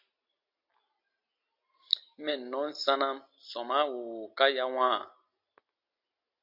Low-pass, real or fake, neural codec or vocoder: 5.4 kHz; real; none